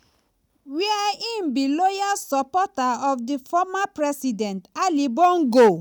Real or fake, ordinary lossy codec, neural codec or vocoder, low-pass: real; none; none; none